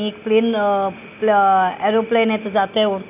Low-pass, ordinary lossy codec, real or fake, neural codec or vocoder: 3.6 kHz; none; real; none